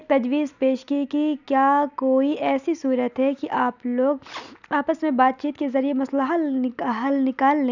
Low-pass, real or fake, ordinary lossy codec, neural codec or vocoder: 7.2 kHz; real; none; none